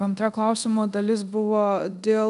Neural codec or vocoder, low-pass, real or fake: codec, 24 kHz, 0.5 kbps, DualCodec; 10.8 kHz; fake